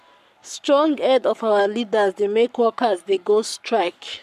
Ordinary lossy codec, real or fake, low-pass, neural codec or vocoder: MP3, 96 kbps; fake; 14.4 kHz; codec, 44.1 kHz, 7.8 kbps, Pupu-Codec